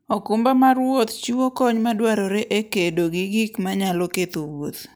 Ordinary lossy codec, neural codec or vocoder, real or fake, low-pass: none; none; real; none